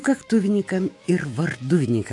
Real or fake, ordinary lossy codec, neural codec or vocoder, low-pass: fake; AAC, 48 kbps; autoencoder, 48 kHz, 128 numbers a frame, DAC-VAE, trained on Japanese speech; 10.8 kHz